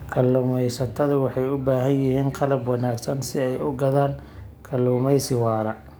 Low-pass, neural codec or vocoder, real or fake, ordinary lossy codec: none; codec, 44.1 kHz, 7.8 kbps, DAC; fake; none